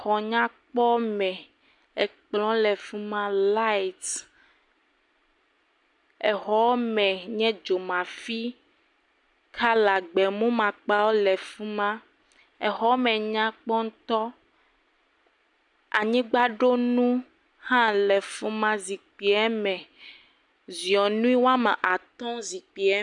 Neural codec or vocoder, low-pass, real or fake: none; 10.8 kHz; real